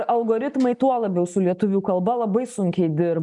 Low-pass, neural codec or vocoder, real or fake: 10.8 kHz; none; real